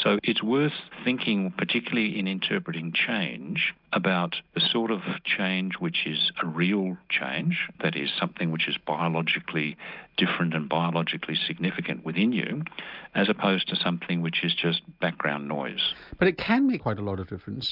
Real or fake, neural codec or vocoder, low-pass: real; none; 5.4 kHz